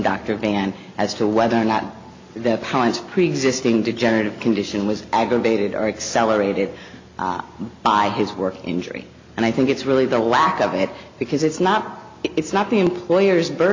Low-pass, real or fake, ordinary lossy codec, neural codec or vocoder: 7.2 kHz; real; MP3, 48 kbps; none